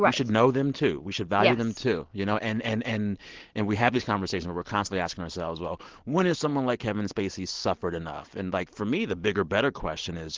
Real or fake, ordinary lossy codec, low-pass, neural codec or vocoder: real; Opus, 16 kbps; 7.2 kHz; none